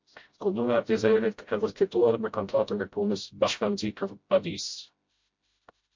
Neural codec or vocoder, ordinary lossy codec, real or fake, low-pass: codec, 16 kHz, 0.5 kbps, FreqCodec, smaller model; MP3, 48 kbps; fake; 7.2 kHz